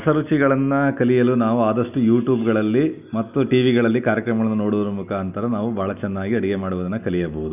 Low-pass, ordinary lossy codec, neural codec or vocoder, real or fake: 3.6 kHz; none; none; real